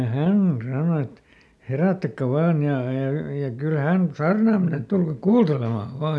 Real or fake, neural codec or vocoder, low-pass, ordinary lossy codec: real; none; none; none